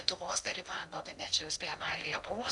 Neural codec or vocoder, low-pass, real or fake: codec, 16 kHz in and 24 kHz out, 0.8 kbps, FocalCodec, streaming, 65536 codes; 10.8 kHz; fake